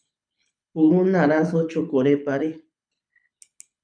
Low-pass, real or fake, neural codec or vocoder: 9.9 kHz; fake; codec, 24 kHz, 6 kbps, HILCodec